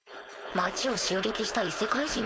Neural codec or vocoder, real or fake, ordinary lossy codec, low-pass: codec, 16 kHz, 4.8 kbps, FACodec; fake; none; none